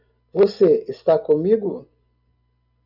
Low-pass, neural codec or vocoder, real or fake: 5.4 kHz; none; real